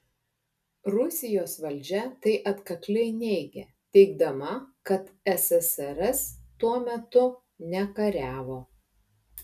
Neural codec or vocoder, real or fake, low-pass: none; real; 14.4 kHz